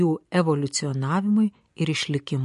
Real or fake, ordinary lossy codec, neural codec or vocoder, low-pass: real; MP3, 48 kbps; none; 10.8 kHz